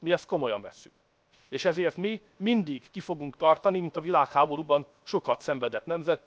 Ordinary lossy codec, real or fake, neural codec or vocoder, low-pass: none; fake; codec, 16 kHz, 0.7 kbps, FocalCodec; none